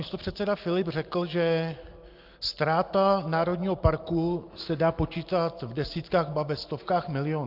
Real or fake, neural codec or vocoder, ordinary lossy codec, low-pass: real; none; Opus, 24 kbps; 5.4 kHz